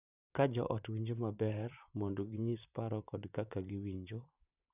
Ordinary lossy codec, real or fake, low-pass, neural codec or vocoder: none; fake; 3.6 kHz; vocoder, 44.1 kHz, 128 mel bands, Pupu-Vocoder